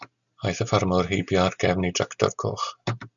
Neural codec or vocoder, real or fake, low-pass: none; real; 7.2 kHz